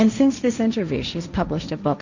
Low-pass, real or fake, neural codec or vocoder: 7.2 kHz; fake; codec, 16 kHz, 1.1 kbps, Voila-Tokenizer